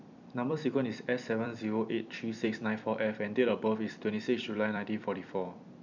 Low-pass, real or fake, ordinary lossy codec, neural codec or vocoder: 7.2 kHz; fake; none; vocoder, 44.1 kHz, 128 mel bands every 256 samples, BigVGAN v2